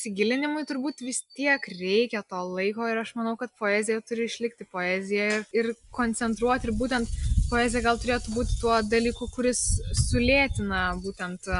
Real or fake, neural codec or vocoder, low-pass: real; none; 10.8 kHz